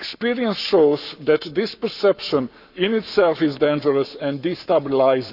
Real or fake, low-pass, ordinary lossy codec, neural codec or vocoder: fake; 5.4 kHz; none; codec, 44.1 kHz, 7.8 kbps, Pupu-Codec